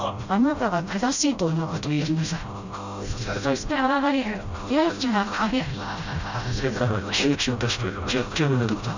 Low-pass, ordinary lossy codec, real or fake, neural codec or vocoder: 7.2 kHz; Opus, 64 kbps; fake; codec, 16 kHz, 0.5 kbps, FreqCodec, smaller model